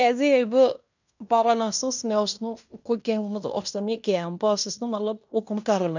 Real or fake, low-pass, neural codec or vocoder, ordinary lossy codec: fake; 7.2 kHz; codec, 16 kHz in and 24 kHz out, 0.9 kbps, LongCat-Audio-Codec, fine tuned four codebook decoder; none